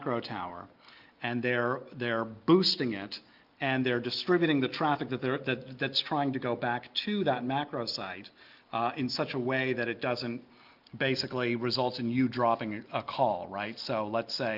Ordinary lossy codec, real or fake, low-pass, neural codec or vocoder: Opus, 32 kbps; real; 5.4 kHz; none